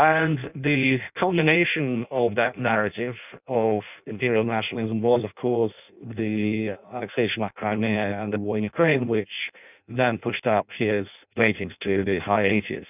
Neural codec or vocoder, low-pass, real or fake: codec, 16 kHz in and 24 kHz out, 0.6 kbps, FireRedTTS-2 codec; 3.6 kHz; fake